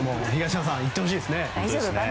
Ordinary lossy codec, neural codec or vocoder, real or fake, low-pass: none; none; real; none